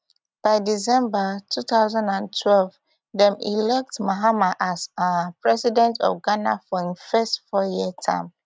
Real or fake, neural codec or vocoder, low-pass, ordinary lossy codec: real; none; none; none